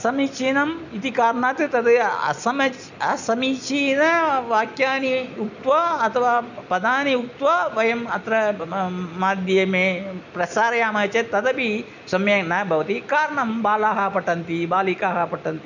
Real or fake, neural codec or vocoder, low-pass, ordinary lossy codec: real; none; 7.2 kHz; none